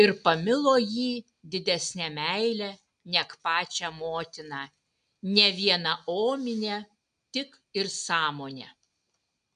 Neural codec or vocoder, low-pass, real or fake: none; 9.9 kHz; real